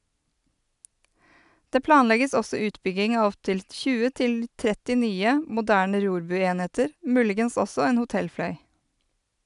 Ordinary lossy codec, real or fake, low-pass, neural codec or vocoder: none; real; 10.8 kHz; none